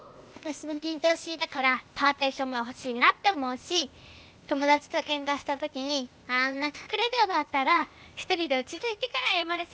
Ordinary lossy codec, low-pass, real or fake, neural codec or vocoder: none; none; fake; codec, 16 kHz, 0.8 kbps, ZipCodec